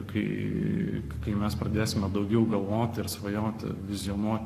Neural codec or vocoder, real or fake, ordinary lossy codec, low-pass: codec, 44.1 kHz, 7.8 kbps, Pupu-Codec; fake; AAC, 64 kbps; 14.4 kHz